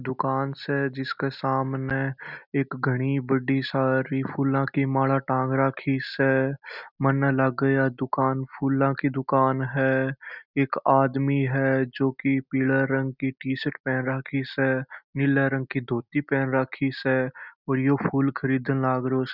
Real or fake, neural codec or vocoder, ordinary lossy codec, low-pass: real; none; none; 5.4 kHz